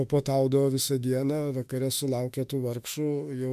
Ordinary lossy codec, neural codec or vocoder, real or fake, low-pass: MP3, 96 kbps; autoencoder, 48 kHz, 32 numbers a frame, DAC-VAE, trained on Japanese speech; fake; 14.4 kHz